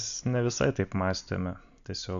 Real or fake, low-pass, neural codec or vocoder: real; 7.2 kHz; none